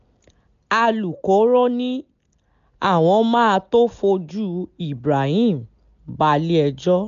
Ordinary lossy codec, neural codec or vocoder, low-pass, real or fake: none; none; 7.2 kHz; real